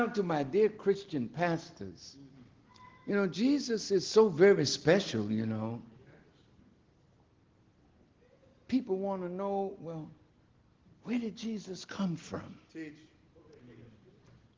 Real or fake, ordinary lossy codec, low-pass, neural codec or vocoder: real; Opus, 16 kbps; 7.2 kHz; none